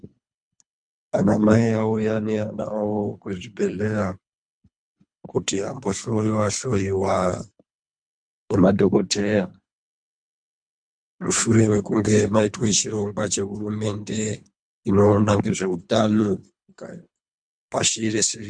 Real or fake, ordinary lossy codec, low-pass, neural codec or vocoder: fake; MP3, 64 kbps; 9.9 kHz; codec, 24 kHz, 3 kbps, HILCodec